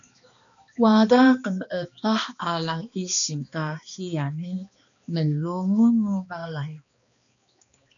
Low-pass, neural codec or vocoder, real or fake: 7.2 kHz; codec, 16 kHz, 2 kbps, X-Codec, HuBERT features, trained on balanced general audio; fake